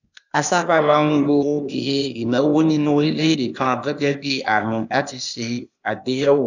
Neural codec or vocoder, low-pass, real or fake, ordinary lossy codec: codec, 16 kHz, 0.8 kbps, ZipCodec; 7.2 kHz; fake; none